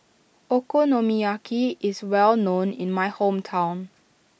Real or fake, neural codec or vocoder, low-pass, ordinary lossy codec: real; none; none; none